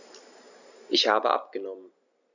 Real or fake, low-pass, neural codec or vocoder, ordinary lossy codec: real; 7.2 kHz; none; none